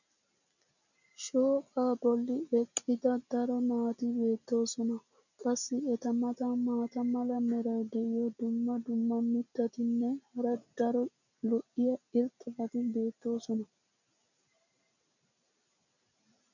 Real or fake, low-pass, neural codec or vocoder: real; 7.2 kHz; none